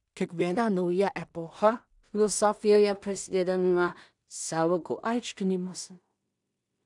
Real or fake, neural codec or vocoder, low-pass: fake; codec, 16 kHz in and 24 kHz out, 0.4 kbps, LongCat-Audio-Codec, two codebook decoder; 10.8 kHz